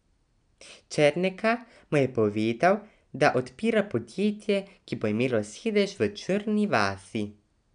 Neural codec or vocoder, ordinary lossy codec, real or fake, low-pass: none; none; real; 9.9 kHz